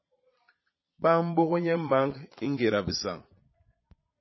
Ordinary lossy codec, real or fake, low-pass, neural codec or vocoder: MP3, 24 kbps; fake; 7.2 kHz; vocoder, 22.05 kHz, 80 mel bands, Vocos